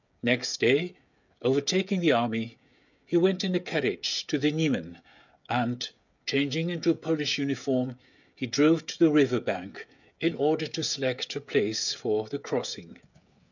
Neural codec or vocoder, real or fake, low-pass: codec, 16 kHz, 16 kbps, FreqCodec, smaller model; fake; 7.2 kHz